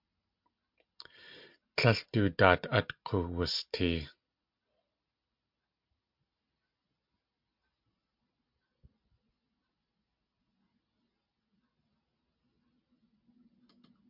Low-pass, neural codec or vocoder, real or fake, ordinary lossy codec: 5.4 kHz; none; real; MP3, 48 kbps